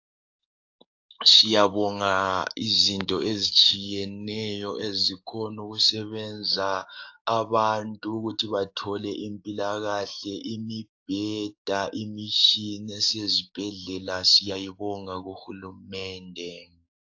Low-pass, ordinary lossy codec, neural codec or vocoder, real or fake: 7.2 kHz; AAC, 48 kbps; codec, 44.1 kHz, 7.8 kbps, DAC; fake